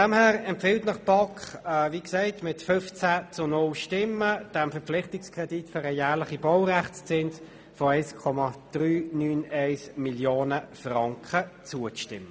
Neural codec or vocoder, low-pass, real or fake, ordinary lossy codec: none; none; real; none